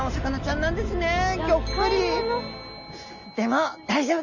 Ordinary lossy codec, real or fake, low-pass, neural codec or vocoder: none; real; 7.2 kHz; none